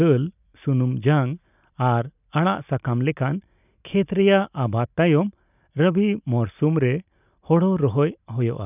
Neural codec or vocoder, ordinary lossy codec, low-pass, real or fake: none; none; 3.6 kHz; real